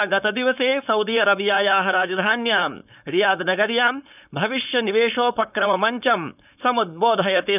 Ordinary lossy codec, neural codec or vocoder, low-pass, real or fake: none; codec, 16 kHz, 4.8 kbps, FACodec; 3.6 kHz; fake